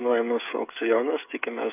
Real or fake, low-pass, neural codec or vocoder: fake; 3.6 kHz; codec, 16 kHz, 8 kbps, FreqCodec, smaller model